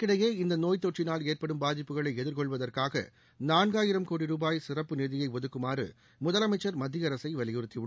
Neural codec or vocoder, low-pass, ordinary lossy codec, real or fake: none; none; none; real